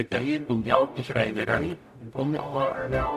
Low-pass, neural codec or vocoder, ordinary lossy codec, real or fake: 14.4 kHz; codec, 44.1 kHz, 0.9 kbps, DAC; none; fake